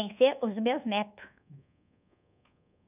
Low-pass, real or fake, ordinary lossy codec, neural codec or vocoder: 3.6 kHz; fake; none; codec, 24 kHz, 1.2 kbps, DualCodec